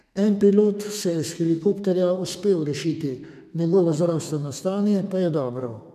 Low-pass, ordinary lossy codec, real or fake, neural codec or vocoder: 14.4 kHz; none; fake; codec, 32 kHz, 1.9 kbps, SNAC